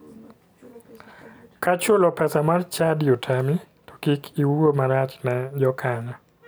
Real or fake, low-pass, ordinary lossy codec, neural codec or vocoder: real; none; none; none